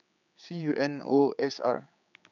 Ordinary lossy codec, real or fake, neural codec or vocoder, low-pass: none; fake; codec, 16 kHz, 4 kbps, X-Codec, HuBERT features, trained on general audio; 7.2 kHz